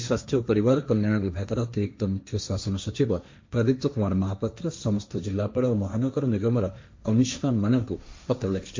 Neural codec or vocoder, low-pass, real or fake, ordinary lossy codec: codec, 16 kHz, 1.1 kbps, Voila-Tokenizer; 7.2 kHz; fake; MP3, 48 kbps